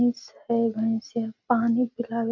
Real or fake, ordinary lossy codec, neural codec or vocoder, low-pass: real; none; none; 7.2 kHz